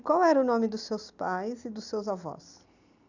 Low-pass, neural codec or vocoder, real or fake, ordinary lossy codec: 7.2 kHz; none; real; none